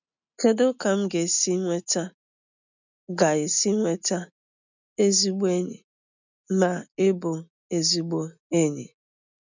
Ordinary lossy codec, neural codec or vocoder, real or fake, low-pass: none; none; real; 7.2 kHz